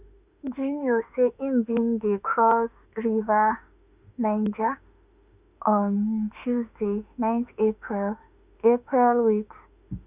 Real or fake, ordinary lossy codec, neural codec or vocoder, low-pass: fake; Opus, 64 kbps; autoencoder, 48 kHz, 32 numbers a frame, DAC-VAE, trained on Japanese speech; 3.6 kHz